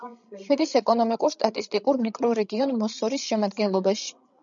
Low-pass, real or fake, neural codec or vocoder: 7.2 kHz; fake; codec, 16 kHz, 16 kbps, FreqCodec, larger model